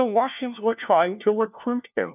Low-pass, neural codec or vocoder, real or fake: 3.6 kHz; codec, 16 kHz, 1 kbps, FunCodec, trained on LibriTTS, 50 frames a second; fake